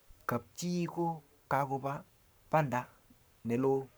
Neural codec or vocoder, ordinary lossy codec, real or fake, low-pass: codec, 44.1 kHz, 7.8 kbps, Pupu-Codec; none; fake; none